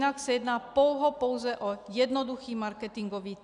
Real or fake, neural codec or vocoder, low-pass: real; none; 10.8 kHz